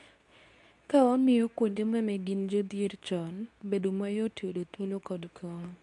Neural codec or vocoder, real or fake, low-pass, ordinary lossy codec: codec, 24 kHz, 0.9 kbps, WavTokenizer, medium speech release version 2; fake; 10.8 kHz; none